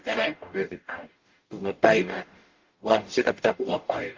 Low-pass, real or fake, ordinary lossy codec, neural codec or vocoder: 7.2 kHz; fake; Opus, 32 kbps; codec, 44.1 kHz, 0.9 kbps, DAC